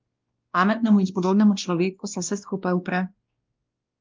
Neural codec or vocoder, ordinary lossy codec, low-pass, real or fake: codec, 16 kHz, 1 kbps, X-Codec, WavLM features, trained on Multilingual LibriSpeech; Opus, 32 kbps; 7.2 kHz; fake